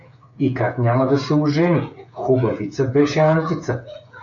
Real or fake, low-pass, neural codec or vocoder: fake; 7.2 kHz; codec, 16 kHz, 8 kbps, FreqCodec, smaller model